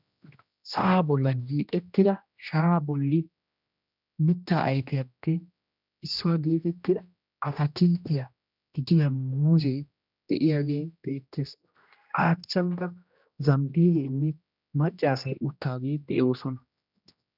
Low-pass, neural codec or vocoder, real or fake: 5.4 kHz; codec, 16 kHz, 1 kbps, X-Codec, HuBERT features, trained on general audio; fake